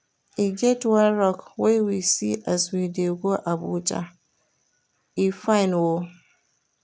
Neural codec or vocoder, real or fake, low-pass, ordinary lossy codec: none; real; none; none